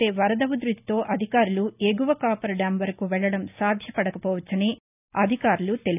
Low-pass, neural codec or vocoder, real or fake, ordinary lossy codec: 3.6 kHz; none; real; none